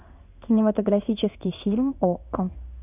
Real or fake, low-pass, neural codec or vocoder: fake; 3.6 kHz; codec, 16 kHz in and 24 kHz out, 1 kbps, XY-Tokenizer